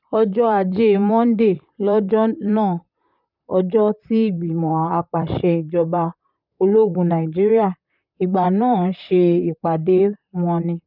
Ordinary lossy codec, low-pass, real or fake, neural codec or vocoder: none; 5.4 kHz; fake; vocoder, 44.1 kHz, 128 mel bands, Pupu-Vocoder